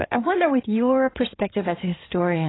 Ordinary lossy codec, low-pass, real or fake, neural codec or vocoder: AAC, 16 kbps; 7.2 kHz; fake; codec, 16 kHz in and 24 kHz out, 2.2 kbps, FireRedTTS-2 codec